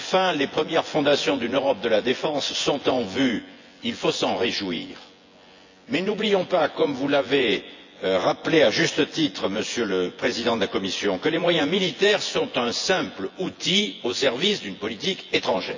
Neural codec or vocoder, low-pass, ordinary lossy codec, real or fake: vocoder, 24 kHz, 100 mel bands, Vocos; 7.2 kHz; AAC, 48 kbps; fake